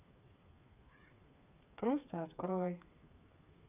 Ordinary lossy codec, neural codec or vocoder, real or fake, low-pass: none; codec, 16 kHz, 4 kbps, FreqCodec, smaller model; fake; 3.6 kHz